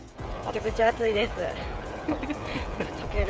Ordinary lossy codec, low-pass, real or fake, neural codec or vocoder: none; none; fake; codec, 16 kHz, 16 kbps, FreqCodec, smaller model